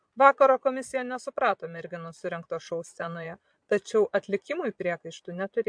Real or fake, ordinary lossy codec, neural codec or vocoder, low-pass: fake; MP3, 64 kbps; vocoder, 22.05 kHz, 80 mel bands, Vocos; 9.9 kHz